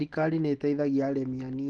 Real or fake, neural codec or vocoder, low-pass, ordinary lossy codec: real; none; 9.9 kHz; Opus, 16 kbps